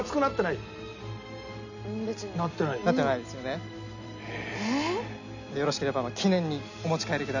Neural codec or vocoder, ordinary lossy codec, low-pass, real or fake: none; none; 7.2 kHz; real